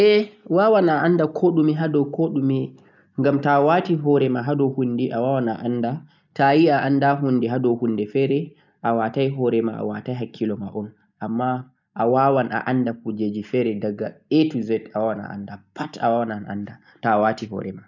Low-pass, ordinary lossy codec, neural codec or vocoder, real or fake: 7.2 kHz; none; none; real